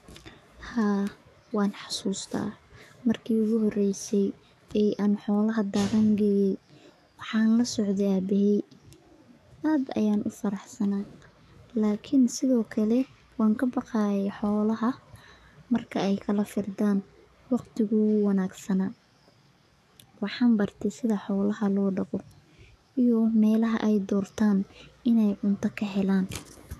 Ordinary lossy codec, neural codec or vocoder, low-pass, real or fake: none; codec, 44.1 kHz, 7.8 kbps, DAC; 14.4 kHz; fake